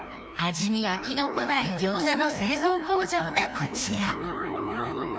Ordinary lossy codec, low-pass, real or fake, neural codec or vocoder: none; none; fake; codec, 16 kHz, 1 kbps, FreqCodec, larger model